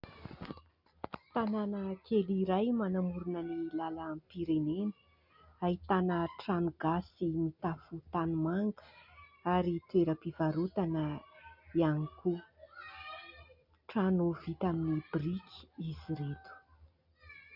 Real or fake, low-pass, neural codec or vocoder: real; 5.4 kHz; none